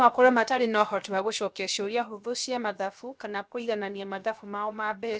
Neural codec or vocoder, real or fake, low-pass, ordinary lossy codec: codec, 16 kHz, 0.7 kbps, FocalCodec; fake; none; none